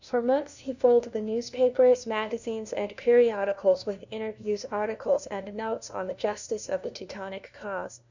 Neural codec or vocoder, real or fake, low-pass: codec, 16 kHz, 1 kbps, FunCodec, trained on LibriTTS, 50 frames a second; fake; 7.2 kHz